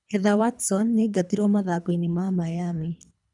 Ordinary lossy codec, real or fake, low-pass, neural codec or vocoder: none; fake; 10.8 kHz; codec, 24 kHz, 3 kbps, HILCodec